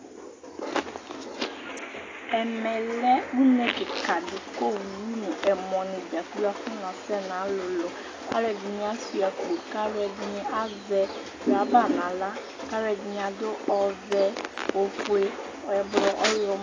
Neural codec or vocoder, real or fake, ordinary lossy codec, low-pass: none; real; AAC, 32 kbps; 7.2 kHz